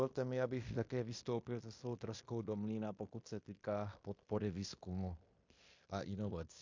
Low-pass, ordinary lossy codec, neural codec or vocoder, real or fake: 7.2 kHz; MP3, 48 kbps; codec, 16 kHz in and 24 kHz out, 0.9 kbps, LongCat-Audio-Codec, fine tuned four codebook decoder; fake